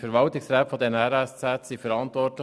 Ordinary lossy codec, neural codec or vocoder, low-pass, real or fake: none; none; none; real